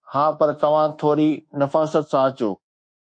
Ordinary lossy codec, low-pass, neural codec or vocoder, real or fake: MP3, 48 kbps; 9.9 kHz; codec, 24 kHz, 1.2 kbps, DualCodec; fake